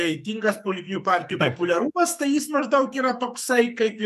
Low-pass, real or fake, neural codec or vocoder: 14.4 kHz; fake; codec, 44.1 kHz, 2.6 kbps, SNAC